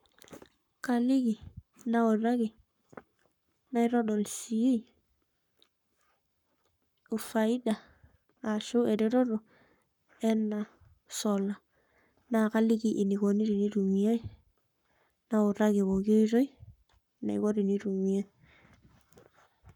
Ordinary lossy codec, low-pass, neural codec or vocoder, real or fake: none; 19.8 kHz; codec, 44.1 kHz, 7.8 kbps, Pupu-Codec; fake